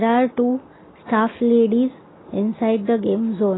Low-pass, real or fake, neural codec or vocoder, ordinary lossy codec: 7.2 kHz; real; none; AAC, 16 kbps